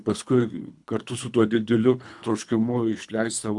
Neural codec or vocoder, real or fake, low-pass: codec, 24 kHz, 3 kbps, HILCodec; fake; 10.8 kHz